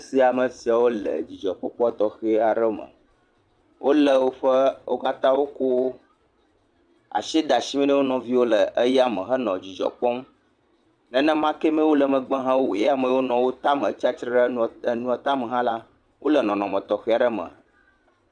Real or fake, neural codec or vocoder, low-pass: fake; vocoder, 22.05 kHz, 80 mel bands, Vocos; 9.9 kHz